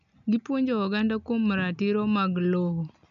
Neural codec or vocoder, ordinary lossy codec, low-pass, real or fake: none; none; 7.2 kHz; real